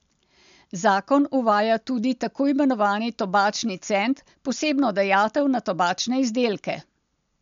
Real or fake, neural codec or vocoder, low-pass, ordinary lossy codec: real; none; 7.2 kHz; MP3, 64 kbps